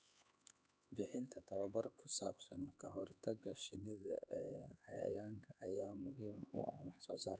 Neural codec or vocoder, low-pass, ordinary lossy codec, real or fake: codec, 16 kHz, 4 kbps, X-Codec, HuBERT features, trained on LibriSpeech; none; none; fake